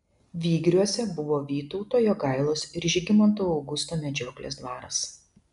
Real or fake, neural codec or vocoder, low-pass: real; none; 10.8 kHz